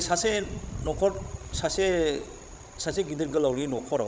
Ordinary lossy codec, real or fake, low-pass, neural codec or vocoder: none; fake; none; codec, 16 kHz, 16 kbps, FreqCodec, larger model